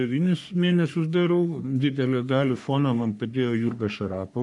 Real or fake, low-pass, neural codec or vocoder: fake; 10.8 kHz; codec, 44.1 kHz, 3.4 kbps, Pupu-Codec